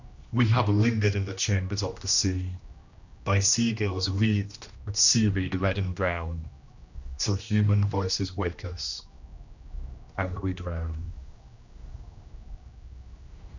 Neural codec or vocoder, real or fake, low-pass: codec, 16 kHz, 1 kbps, X-Codec, HuBERT features, trained on general audio; fake; 7.2 kHz